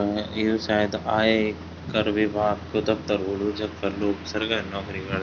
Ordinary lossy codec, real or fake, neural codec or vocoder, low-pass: none; real; none; 7.2 kHz